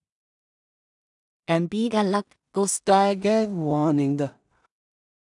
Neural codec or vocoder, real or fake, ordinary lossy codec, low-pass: codec, 16 kHz in and 24 kHz out, 0.4 kbps, LongCat-Audio-Codec, two codebook decoder; fake; none; 10.8 kHz